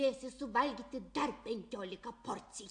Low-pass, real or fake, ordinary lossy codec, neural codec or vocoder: 9.9 kHz; real; AAC, 64 kbps; none